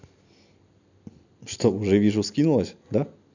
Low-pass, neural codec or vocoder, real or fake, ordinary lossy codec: 7.2 kHz; none; real; none